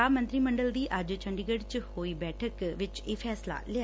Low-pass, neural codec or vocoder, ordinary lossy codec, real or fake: none; none; none; real